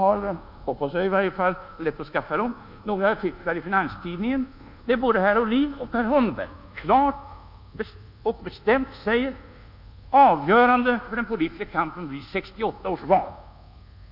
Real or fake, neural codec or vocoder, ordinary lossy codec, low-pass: fake; codec, 24 kHz, 1.2 kbps, DualCodec; none; 5.4 kHz